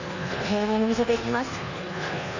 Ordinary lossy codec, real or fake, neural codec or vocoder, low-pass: none; fake; codec, 24 kHz, 1.2 kbps, DualCodec; 7.2 kHz